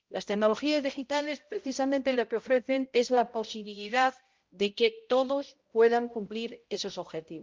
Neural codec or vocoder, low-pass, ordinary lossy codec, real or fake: codec, 16 kHz, 0.5 kbps, X-Codec, HuBERT features, trained on balanced general audio; 7.2 kHz; Opus, 32 kbps; fake